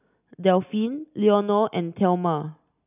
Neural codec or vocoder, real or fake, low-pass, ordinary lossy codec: none; real; 3.6 kHz; none